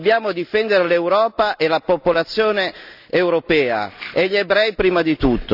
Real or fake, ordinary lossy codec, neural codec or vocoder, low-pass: real; none; none; 5.4 kHz